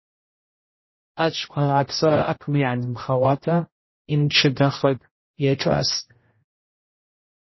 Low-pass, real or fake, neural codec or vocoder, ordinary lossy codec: 7.2 kHz; fake; codec, 16 kHz, 0.5 kbps, X-Codec, HuBERT features, trained on general audio; MP3, 24 kbps